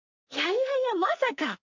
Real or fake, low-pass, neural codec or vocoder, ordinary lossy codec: fake; 7.2 kHz; codec, 44.1 kHz, 2.6 kbps, SNAC; MP3, 64 kbps